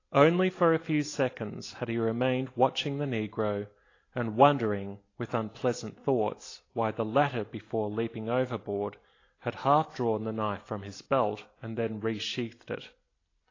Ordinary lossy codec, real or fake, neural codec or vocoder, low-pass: AAC, 32 kbps; real; none; 7.2 kHz